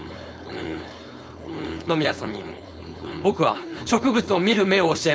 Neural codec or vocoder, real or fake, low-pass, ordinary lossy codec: codec, 16 kHz, 4.8 kbps, FACodec; fake; none; none